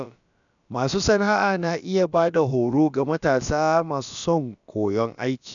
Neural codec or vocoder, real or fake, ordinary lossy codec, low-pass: codec, 16 kHz, about 1 kbps, DyCAST, with the encoder's durations; fake; none; 7.2 kHz